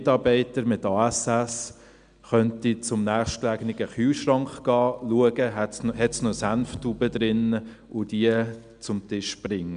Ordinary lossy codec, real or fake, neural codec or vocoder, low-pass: none; real; none; 9.9 kHz